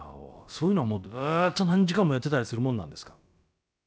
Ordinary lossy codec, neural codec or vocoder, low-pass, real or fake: none; codec, 16 kHz, about 1 kbps, DyCAST, with the encoder's durations; none; fake